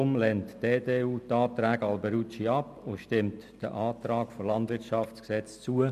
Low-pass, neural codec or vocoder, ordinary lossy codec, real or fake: 14.4 kHz; none; MP3, 64 kbps; real